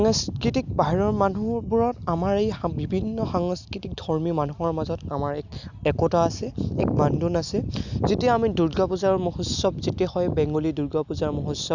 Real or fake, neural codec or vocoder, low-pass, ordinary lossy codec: real; none; 7.2 kHz; none